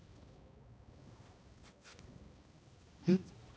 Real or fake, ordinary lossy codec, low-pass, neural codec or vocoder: fake; none; none; codec, 16 kHz, 1 kbps, X-Codec, HuBERT features, trained on balanced general audio